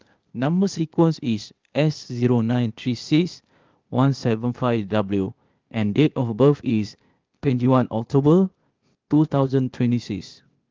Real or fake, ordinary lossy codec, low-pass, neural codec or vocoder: fake; Opus, 32 kbps; 7.2 kHz; codec, 16 kHz, 0.8 kbps, ZipCodec